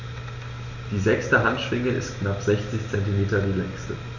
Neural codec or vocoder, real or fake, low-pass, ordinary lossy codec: none; real; 7.2 kHz; none